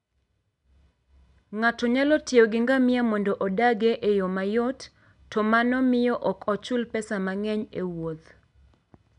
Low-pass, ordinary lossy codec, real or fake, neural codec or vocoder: 10.8 kHz; MP3, 96 kbps; real; none